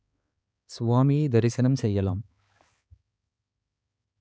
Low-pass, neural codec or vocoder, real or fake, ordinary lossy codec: none; codec, 16 kHz, 4 kbps, X-Codec, HuBERT features, trained on balanced general audio; fake; none